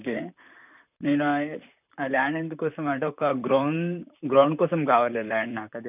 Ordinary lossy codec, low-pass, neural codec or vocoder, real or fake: none; 3.6 kHz; vocoder, 44.1 kHz, 128 mel bands, Pupu-Vocoder; fake